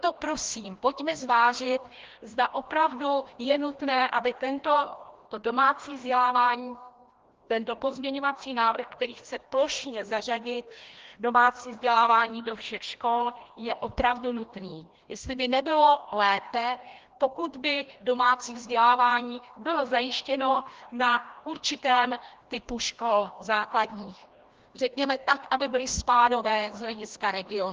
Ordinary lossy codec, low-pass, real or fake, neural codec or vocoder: Opus, 16 kbps; 7.2 kHz; fake; codec, 16 kHz, 1 kbps, FreqCodec, larger model